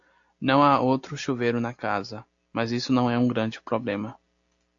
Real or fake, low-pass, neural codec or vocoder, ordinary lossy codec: real; 7.2 kHz; none; AAC, 48 kbps